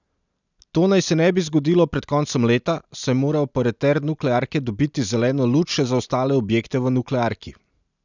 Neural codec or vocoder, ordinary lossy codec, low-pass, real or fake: none; none; 7.2 kHz; real